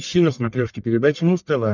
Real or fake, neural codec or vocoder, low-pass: fake; codec, 44.1 kHz, 1.7 kbps, Pupu-Codec; 7.2 kHz